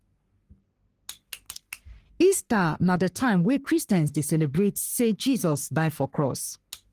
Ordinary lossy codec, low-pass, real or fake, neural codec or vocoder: Opus, 24 kbps; 14.4 kHz; fake; codec, 44.1 kHz, 3.4 kbps, Pupu-Codec